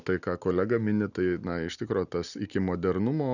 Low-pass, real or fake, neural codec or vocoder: 7.2 kHz; real; none